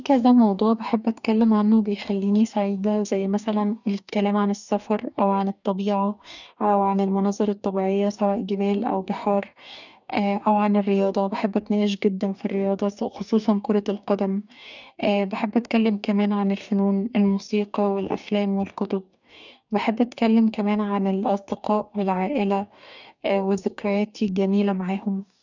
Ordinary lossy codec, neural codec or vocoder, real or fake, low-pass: none; codec, 44.1 kHz, 2.6 kbps, DAC; fake; 7.2 kHz